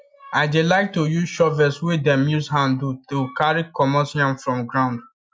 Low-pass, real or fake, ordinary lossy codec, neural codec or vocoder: none; real; none; none